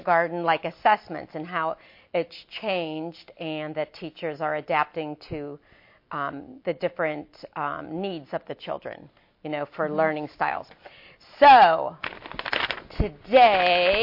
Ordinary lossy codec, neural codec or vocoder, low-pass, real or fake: MP3, 32 kbps; none; 5.4 kHz; real